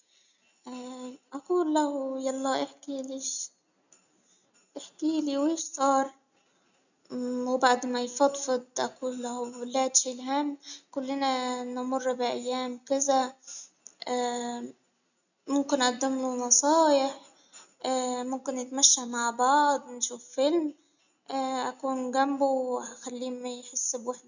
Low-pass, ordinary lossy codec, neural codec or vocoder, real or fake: 7.2 kHz; none; none; real